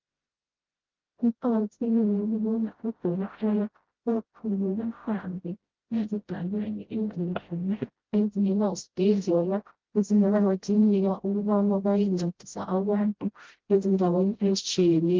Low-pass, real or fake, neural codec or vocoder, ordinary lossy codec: 7.2 kHz; fake; codec, 16 kHz, 0.5 kbps, FreqCodec, smaller model; Opus, 16 kbps